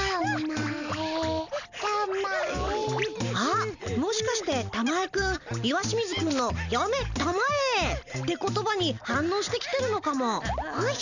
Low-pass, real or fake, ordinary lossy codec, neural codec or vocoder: 7.2 kHz; real; none; none